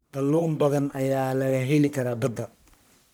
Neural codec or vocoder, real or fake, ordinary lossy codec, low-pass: codec, 44.1 kHz, 1.7 kbps, Pupu-Codec; fake; none; none